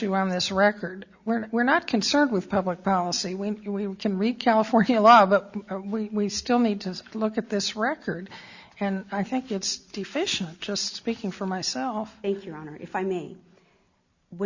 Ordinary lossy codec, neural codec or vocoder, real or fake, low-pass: Opus, 64 kbps; none; real; 7.2 kHz